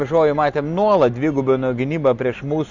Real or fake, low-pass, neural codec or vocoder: real; 7.2 kHz; none